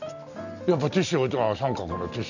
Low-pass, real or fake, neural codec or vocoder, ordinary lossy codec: 7.2 kHz; real; none; none